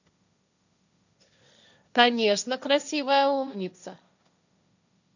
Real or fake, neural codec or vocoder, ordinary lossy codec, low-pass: fake; codec, 16 kHz, 1.1 kbps, Voila-Tokenizer; none; 7.2 kHz